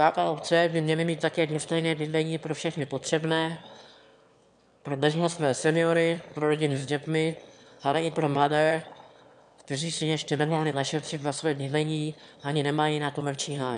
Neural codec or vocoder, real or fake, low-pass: autoencoder, 22.05 kHz, a latent of 192 numbers a frame, VITS, trained on one speaker; fake; 9.9 kHz